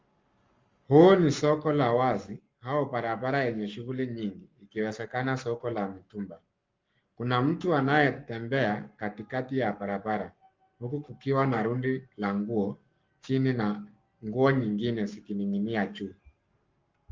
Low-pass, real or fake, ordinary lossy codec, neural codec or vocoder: 7.2 kHz; fake; Opus, 32 kbps; codec, 44.1 kHz, 7.8 kbps, Pupu-Codec